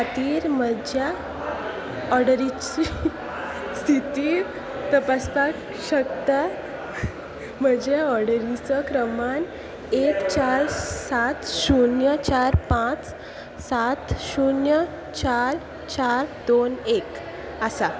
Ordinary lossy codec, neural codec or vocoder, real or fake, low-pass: none; none; real; none